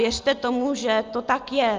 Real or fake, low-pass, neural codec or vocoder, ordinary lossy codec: real; 7.2 kHz; none; Opus, 32 kbps